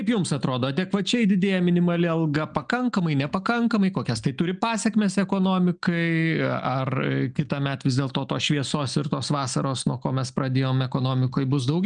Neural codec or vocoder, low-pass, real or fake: none; 9.9 kHz; real